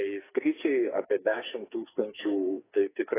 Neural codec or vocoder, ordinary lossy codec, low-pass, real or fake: codec, 44.1 kHz, 2.6 kbps, SNAC; AAC, 16 kbps; 3.6 kHz; fake